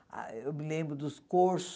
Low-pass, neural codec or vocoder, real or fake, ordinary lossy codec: none; none; real; none